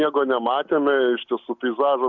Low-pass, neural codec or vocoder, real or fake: 7.2 kHz; none; real